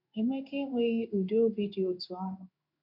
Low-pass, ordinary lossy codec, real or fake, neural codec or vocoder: 5.4 kHz; none; fake; codec, 16 kHz in and 24 kHz out, 1 kbps, XY-Tokenizer